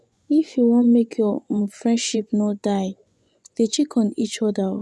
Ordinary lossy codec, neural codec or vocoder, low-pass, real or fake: none; vocoder, 24 kHz, 100 mel bands, Vocos; none; fake